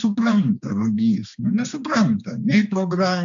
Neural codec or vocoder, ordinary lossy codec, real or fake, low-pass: codec, 16 kHz, 2 kbps, X-Codec, HuBERT features, trained on general audio; AAC, 64 kbps; fake; 7.2 kHz